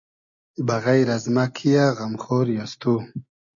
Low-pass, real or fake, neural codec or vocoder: 7.2 kHz; real; none